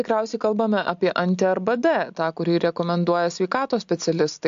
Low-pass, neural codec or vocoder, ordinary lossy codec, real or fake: 7.2 kHz; none; AAC, 64 kbps; real